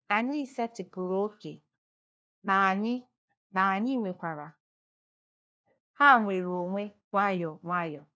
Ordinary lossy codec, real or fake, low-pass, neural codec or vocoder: none; fake; none; codec, 16 kHz, 1 kbps, FunCodec, trained on LibriTTS, 50 frames a second